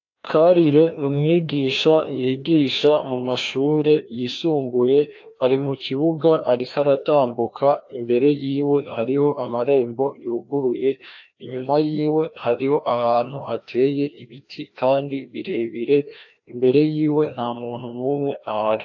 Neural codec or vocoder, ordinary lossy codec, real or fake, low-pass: codec, 16 kHz, 1 kbps, FreqCodec, larger model; AAC, 48 kbps; fake; 7.2 kHz